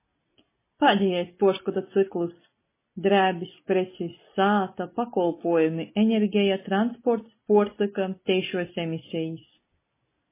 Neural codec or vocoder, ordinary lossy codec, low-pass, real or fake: none; MP3, 16 kbps; 3.6 kHz; real